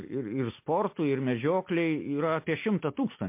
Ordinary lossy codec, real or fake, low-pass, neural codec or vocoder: MP3, 24 kbps; real; 3.6 kHz; none